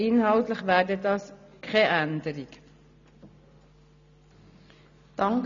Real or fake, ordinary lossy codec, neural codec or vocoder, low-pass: real; MP3, 96 kbps; none; 7.2 kHz